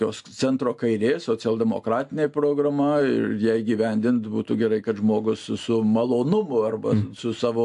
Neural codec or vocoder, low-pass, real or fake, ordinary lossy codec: none; 10.8 kHz; real; AAC, 64 kbps